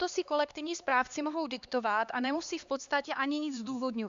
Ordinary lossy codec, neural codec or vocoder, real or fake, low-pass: Opus, 64 kbps; codec, 16 kHz, 2 kbps, X-Codec, HuBERT features, trained on LibriSpeech; fake; 7.2 kHz